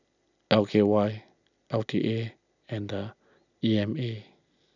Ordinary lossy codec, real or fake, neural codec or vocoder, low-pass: none; real; none; 7.2 kHz